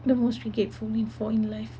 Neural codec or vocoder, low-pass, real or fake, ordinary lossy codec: none; none; real; none